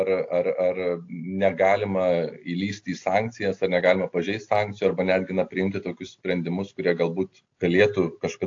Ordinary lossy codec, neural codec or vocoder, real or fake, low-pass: AAC, 48 kbps; none; real; 7.2 kHz